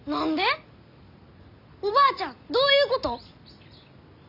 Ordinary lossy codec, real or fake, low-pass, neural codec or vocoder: none; real; 5.4 kHz; none